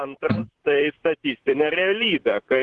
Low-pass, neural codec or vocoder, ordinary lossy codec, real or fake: 10.8 kHz; vocoder, 44.1 kHz, 128 mel bands, Pupu-Vocoder; Opus, 16 kbps; fake